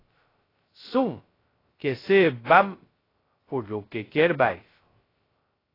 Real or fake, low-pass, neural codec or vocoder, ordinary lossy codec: fake; 5.4 kHz; codec, 16 kHz, 0.2 kbps, FocalCodec; AAC, 24 kbps